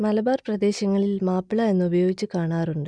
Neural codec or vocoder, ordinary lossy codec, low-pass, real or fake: none; none; 9.9 kHz; real